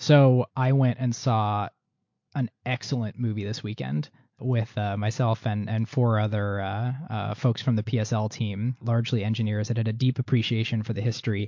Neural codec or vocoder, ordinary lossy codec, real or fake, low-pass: none; MP3, 48 kbps; real; 7.2 kHz